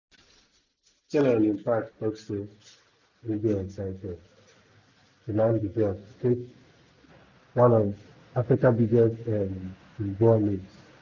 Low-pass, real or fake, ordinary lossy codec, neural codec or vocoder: 7.2 kHz; real; none; none